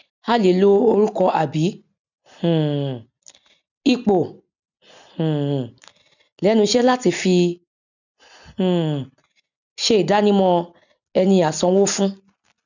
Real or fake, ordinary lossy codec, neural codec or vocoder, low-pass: real; none; none; 7.2 kHz